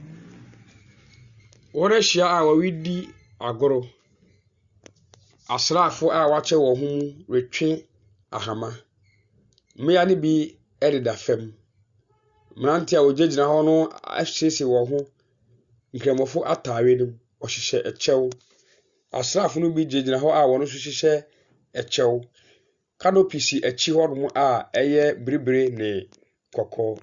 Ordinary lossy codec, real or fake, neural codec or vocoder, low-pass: Opus, 64 kbps; real; none; 7.2 kHz